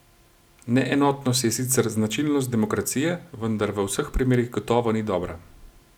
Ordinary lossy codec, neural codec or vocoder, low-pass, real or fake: none; none; 19.8 kHz; real